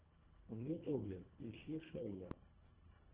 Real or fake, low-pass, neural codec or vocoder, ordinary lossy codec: fake; 3.6 kHz; codec, 24 kHz, 1.5 kbps, HILCodec; Opus, 16 kbps